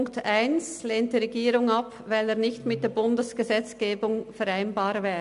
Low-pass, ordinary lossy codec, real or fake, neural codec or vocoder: 10.8 kHz; MP3, 64 kbps; real; none